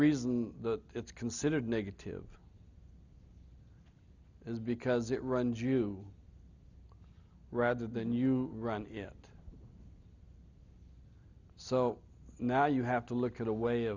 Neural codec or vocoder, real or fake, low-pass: none; real; 7.2 kHz